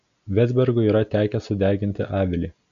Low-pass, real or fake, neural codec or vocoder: 7.2 kHz; real; none